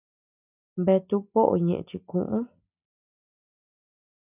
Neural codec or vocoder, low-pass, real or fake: none; 3.6 kHz; real